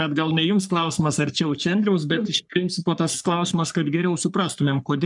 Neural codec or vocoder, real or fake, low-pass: codec, 44.1 kHz, 3.4 kbps, Pupu-Codec; fake; 10.8 kHz